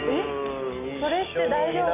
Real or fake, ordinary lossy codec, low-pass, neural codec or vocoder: real; AAC, 24 kbps; 3.6 kHz; none